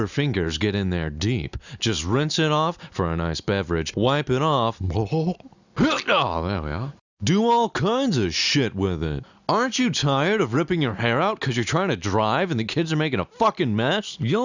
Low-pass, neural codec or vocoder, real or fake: 7.2 kHz; none; real